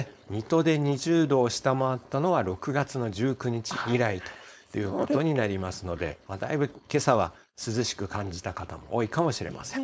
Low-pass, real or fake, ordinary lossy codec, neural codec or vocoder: none; fake; none; codec, 16 kHz, 4.8 kbps, FACodec